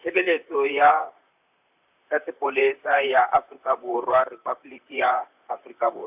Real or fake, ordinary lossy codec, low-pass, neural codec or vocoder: fake; AAC, 32 kbps; 3.6 kHz; vocoder, 44.1 kHz, 128 mel bands, Pupu-Vocoder